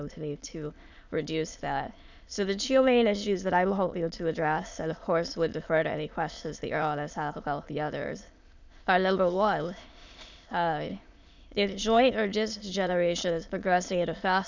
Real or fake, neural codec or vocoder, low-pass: fake; autoencoder, 22.05 kHz, a latent of 192 numbers a frame, VITS, trained on many speakers; 7.2 kHz